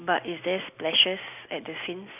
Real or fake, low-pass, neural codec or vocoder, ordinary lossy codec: real; 3.6 kHz; none; none